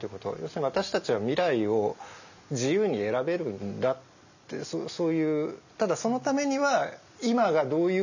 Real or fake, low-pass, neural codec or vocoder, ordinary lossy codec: real; 7.2 kHz; none; none